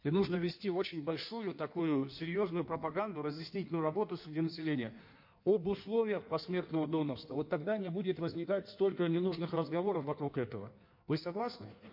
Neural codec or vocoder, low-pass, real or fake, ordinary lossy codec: codec, 16 kHz in and 24 kHz out, 1.1 kbps, FireRedTTS-2 codec; 5.4 kHz; fake; MP3, 32 kbps